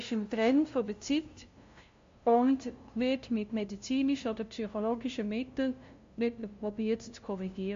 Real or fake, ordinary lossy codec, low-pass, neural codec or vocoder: fake; MP3, 48 kbps; 7.2 kHz; codec, 16 kHz, 0.5 kbps, FunCodec, trained on LibriTTS, 25 frames a second